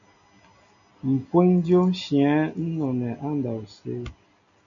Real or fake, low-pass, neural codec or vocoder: real; 7.2 kHz; none